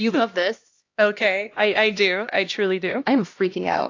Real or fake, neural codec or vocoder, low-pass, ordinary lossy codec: fake; codec, 16 kHz, 1 kbps, X-Codec, HuBERT features, trained on LibriSpeech; 7.2 kHz; AAC, 48 kbps